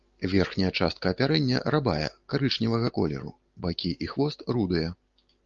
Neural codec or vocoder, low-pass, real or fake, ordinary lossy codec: none; 7.2 kHz; real; Opus, 32 kbps